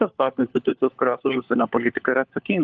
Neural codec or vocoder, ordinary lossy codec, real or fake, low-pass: codec, 16 kHz, 4 kbps, FunCodec, trained on LibriTTS, 50 frames a second; Opus, 32 kbps; fake; 7.2 kHz